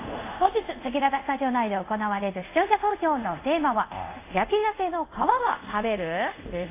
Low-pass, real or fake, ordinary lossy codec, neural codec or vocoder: 3.6 kHz; fake; AAC, 24 kbps; codec, 24 kHz, 0.5 kbps, DualCodec